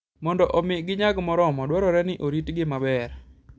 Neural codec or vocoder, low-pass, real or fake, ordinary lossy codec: none; none; real; none